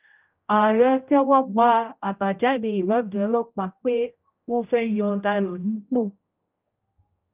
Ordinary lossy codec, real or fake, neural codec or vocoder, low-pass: Opus, 24 kbps; fake; codec, 16 kHz, 0.5 kbps, X-Codec, HuBERT features, trained on general audio; 3.6 kHz